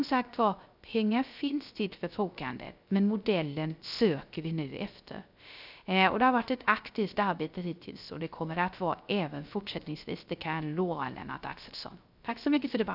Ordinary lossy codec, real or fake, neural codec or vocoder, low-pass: none; fake; codec, 16 kHz, 0.3 kbps, FocalCodec; 5.4 kHz